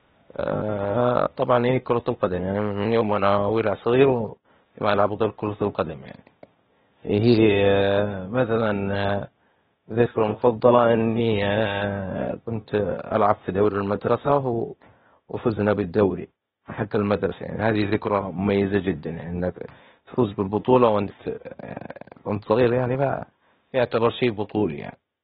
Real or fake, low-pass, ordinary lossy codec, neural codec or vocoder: fake; 7.2 kHz; AAC, 16 kbps; codec, 16 kHz, 0.8 kbps, ZipCodec